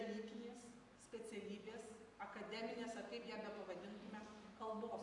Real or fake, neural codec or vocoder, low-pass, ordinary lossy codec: real; none; 10.8 kHz; AAC, 48 kbps